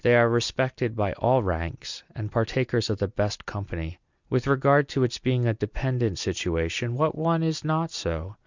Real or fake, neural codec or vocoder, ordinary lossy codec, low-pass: real; none; Opus, 64 kbps; 7.2 kHz